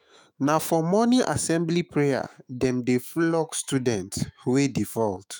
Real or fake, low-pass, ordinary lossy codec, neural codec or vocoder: fake; none; none; autoencoder, 48 kHz, 128 numbers a frame, DAC-VAE, trained on Japanese speech